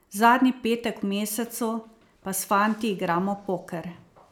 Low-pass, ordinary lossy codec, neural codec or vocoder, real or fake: none; none; none; real